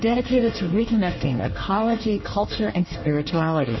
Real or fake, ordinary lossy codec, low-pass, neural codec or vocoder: fake; MP3, 24 kbps; 7.2 kHz; codec, 24 kHz, 1 kbps, SNAC